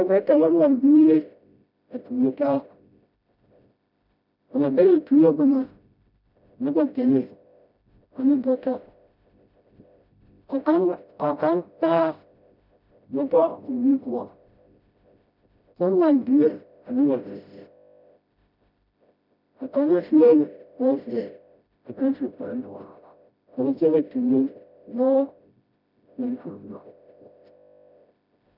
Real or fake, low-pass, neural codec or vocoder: fake; 5.4 kHz; codec, 16 kHz, 0.5 kbps, FreqCodec, smaller model